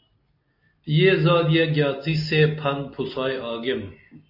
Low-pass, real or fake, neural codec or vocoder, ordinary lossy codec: 5.4 kHz; real; none; AAC, 48 kbps